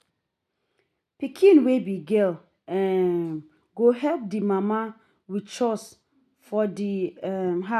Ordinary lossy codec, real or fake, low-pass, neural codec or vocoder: none; real; 14.4 kHz; none